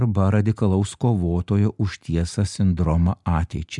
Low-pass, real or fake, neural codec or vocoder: 10.8 kHz; real; none